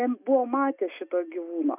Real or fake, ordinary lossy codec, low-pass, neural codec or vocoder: real; AAC, 32 kbps; 3.6 kHz; none